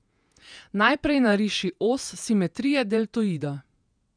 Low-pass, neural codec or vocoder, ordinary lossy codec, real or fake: 9.9 kHz; vocoder, 48 kHz, 128 mel bands, Vocos; none; fake